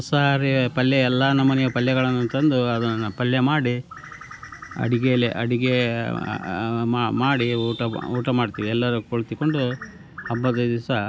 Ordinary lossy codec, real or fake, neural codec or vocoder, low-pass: none; real; none; none